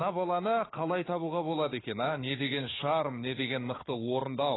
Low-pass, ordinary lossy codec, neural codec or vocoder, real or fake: 7.2 kHz; AAC, 16 kbps; codec, 16 kHz, 4 kbps, FunCodec, trained on Chinese and English, 50 frames a second; fake